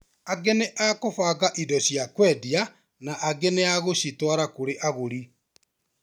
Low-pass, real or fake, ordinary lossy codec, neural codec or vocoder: none; real; none; none